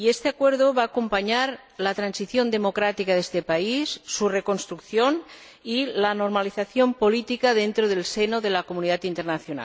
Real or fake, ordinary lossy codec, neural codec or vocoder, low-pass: real; none; none; none